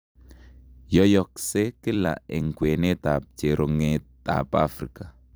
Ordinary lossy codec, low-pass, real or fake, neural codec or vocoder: none; none; fake; vocoder, 44.1 kHz, 128 mel bands every 256 samples, BigVGAN v2